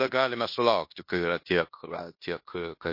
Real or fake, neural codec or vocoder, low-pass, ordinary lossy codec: fake; codec, 16 kHz in and 24 kHz out, 0.9 kbps, LongCat-Audio-Codec, fine tuned four codebook decoder; 5.4 kHz; MP3, 32 kbps